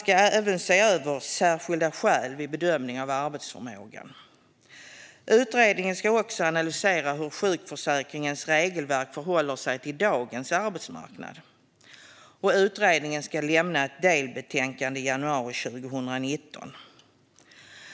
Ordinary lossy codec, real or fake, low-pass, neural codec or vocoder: none; real; none; none